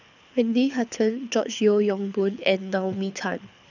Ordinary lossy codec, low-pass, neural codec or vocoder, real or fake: none; 7.2 kHz; codec, 24 kHz, 6 kbps, HILCodec; fake